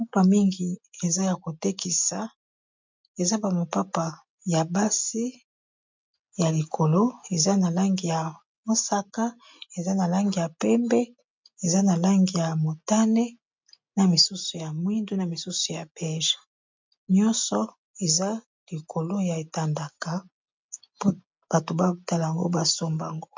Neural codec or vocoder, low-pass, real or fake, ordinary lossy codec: none; 7.2 kHz; real; MP3, 64 kbps